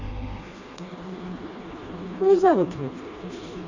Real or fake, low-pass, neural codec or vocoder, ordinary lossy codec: fake; 7.2 kHz; codec, 16 kHz, 2 kbps, FreqCodec, smaller model; Opus, 64 kbps